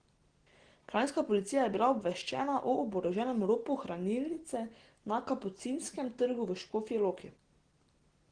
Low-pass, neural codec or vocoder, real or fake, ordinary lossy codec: 9.9 kHz; none; real; Opus, 16 kbps